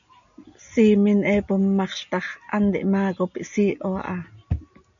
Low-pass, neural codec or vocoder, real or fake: 7.2 kHz; none; real